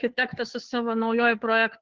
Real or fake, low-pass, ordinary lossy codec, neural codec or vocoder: fake; 7.2 kHz; Opus, 16 kbps; codec, 16 kHz, 2 kbps, FunCodec, trained on Chinese and English, 25 frames a second